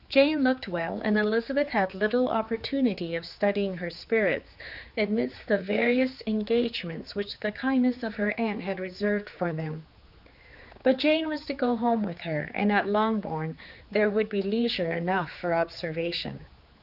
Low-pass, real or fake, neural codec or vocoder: 5.4 kHz; fake; codec, 16 kHz, 4 kbps, X-Codec, HuBERT features, trained on general audio